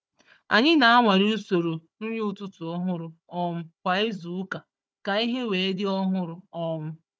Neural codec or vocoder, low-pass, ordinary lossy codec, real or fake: codec, 16 kHz, 4 kbps, FunCodec, trained on Chinese and English, 50 frames a second; none; none; fake